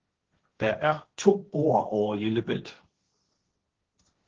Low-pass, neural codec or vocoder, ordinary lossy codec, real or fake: 7.2 kHz; codec, 16 kHz, 1.1 kbps, Voila-Tokenizer; Opus, 16 kbps; fake